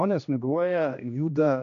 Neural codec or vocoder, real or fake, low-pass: codec, 16 kHz, 1 kbps, X-Codec, HuBERT features, trained on general audio; fake; 7.2 kHz